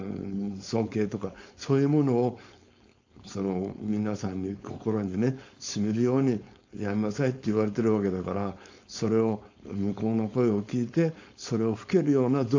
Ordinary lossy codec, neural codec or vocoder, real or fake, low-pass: AAC, 48 kbps; codec, 16 kHz, 4.8 kbps, FACodec; fake; 7.2 kHz